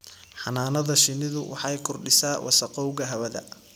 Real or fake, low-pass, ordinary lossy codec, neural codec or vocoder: real; none; none; none